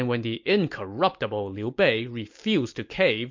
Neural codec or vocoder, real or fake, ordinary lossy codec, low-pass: none; real; MP3, 48 kbps; 7.2 kHz